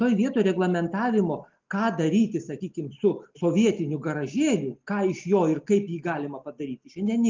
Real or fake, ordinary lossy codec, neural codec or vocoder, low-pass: real; Opus, 32 kbps; none; 7.2 kHz